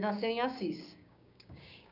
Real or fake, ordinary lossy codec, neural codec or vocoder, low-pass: fake; none; codec, 16 kHz, 6 kbps, DAC; 5.4 kHz